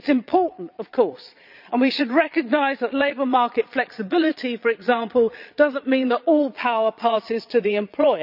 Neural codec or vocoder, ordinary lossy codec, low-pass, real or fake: vocoder, 22.05 kHz, 80 mel bands, Vocos; none; 5.4 kHz; fake